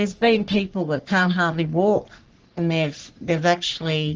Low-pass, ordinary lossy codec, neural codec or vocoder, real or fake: 7.2 kHz; Opus, 16 kbps; codec, 44.1 kHz, 3.4 kbps, Pupu-Codec; fake